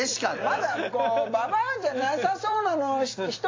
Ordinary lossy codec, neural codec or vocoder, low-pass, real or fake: AAC, 32 kbps; vocoder, 44.1 kHz, 128 mel bands every 256 samples, BigVGAN v2; 7.2 kHz; fake